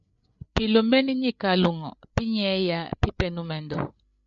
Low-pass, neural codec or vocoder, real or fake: 7.2 kHz; codec, 16 kHz, 8 kbps, FreqCodec, larger model; fake